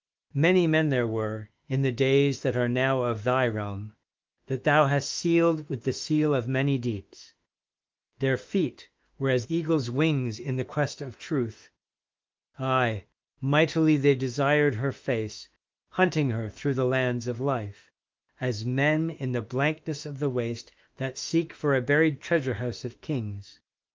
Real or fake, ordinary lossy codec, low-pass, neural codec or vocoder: fake; Opus, 24 kbps; 7.2 kHz; autoencoder, 48 kHz, 32 numbers a frame, DAC-VAE, trained on Japanese speech